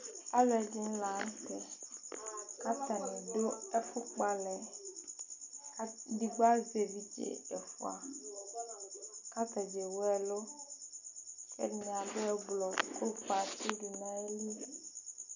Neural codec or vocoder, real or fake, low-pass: none; real; 7.2 kHz